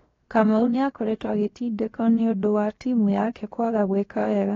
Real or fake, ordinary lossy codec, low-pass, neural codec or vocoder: fake; AAC, 32 kbps; 7.2 kHz; codec, 16 kHz, 0.7 kbps, FocalCodec